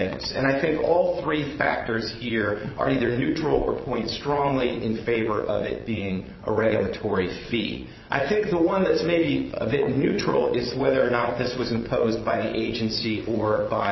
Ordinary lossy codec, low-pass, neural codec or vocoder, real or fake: MP3, 24 kbps; 7.2 kHz; vocoder, 22.05 kHz, 80 mel bands, WaveNeXt; fake